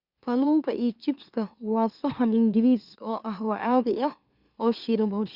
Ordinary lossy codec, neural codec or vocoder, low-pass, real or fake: Opus, 64 kbps; autoencoder, 44.1 kHz, a latent of 192 numbers a frame, MeloTTS; 5.4 kHz; fake